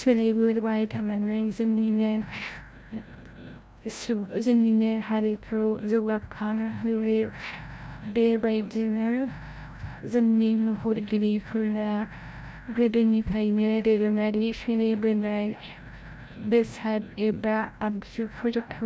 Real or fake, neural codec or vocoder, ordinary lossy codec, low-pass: fake; codec, 16 kHz, 0.5 kbps, FreqCodec, larger model; none; none